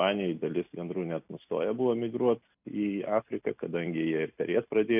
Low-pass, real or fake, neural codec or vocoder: 3.6 kHz; real; none